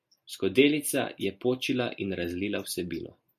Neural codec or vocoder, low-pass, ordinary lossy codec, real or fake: none; 14.4 kHz; MP3, 64 kbps; real